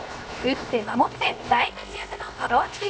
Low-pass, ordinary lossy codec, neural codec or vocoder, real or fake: none; none; codec, 16 kHz, 0.7 kbps, FocalCodec; fake